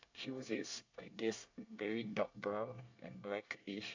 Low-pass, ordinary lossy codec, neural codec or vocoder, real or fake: 7.2 kHz; AAC, 48 kbps; codec, 24 kHz, 1 kbps, SNAC; fake